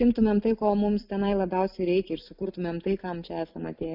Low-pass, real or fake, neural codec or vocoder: 5.4 kHz; real; none